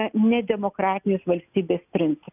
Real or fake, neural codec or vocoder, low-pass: real; none; 3.6 kHz